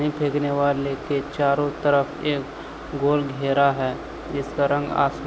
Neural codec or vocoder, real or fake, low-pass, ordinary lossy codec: none; real; none; none